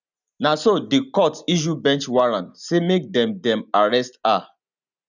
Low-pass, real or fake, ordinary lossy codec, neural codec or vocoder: 7.2 kHz; real; none; none